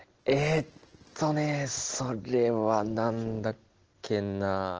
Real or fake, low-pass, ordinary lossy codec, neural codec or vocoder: real; 7.2 kHz; Opus, 16 kbps; none